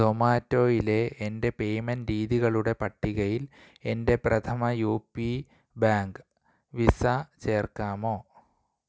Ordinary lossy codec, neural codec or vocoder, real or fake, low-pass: none; none; real; none